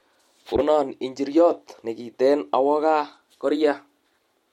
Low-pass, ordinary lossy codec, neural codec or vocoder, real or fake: 19.8 kHz; MP3, 64 kbps; none; real